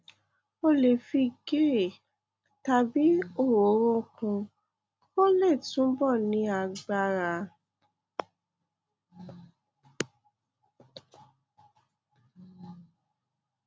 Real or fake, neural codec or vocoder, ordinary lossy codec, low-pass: real; none; none; none